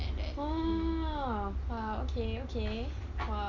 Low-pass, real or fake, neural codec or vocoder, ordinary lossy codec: 7.2 kHz; real; none; none